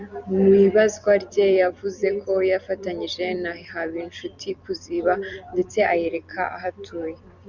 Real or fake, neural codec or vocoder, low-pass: fake; vocoder, 44.1 kHz, 128 mel bands every 256 samples, BigVGAN v2; 7.2 kHz